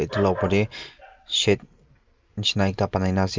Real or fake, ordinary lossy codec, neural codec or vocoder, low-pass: real; Opus, 24 kbps; none; 7.2 kHz